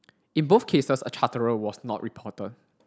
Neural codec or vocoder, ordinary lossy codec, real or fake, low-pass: none; none; real; none